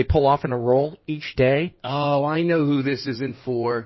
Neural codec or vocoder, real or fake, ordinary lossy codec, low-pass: codec, 16 kHz in and 24 kHz out, 2.2 kbps, FireRedTTS-2 codec; fake; MP3, 24 kbps; 7.2 kHz